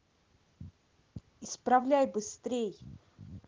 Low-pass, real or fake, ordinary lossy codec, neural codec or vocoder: 7.2 kHz; real; Opus, 16 kbps; none